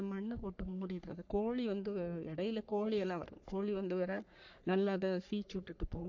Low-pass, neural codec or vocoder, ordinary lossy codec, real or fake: 7.2 kHz; codec, 44.1 kHz, 3.4 kbps, Pupu-Codec; AAC, 48 kbps; fake